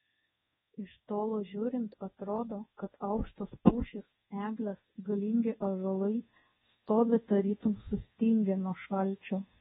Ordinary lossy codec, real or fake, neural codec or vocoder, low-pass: AAC, 16 kbps; fake; codec, 24 kHz, 1.2 kbps, DualCodec; 10.8 kHz